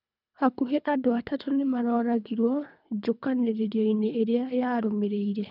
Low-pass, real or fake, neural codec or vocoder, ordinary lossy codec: 5.4 kHz; fake; codec, 24 kHz, 3 kbps, HILCodec; none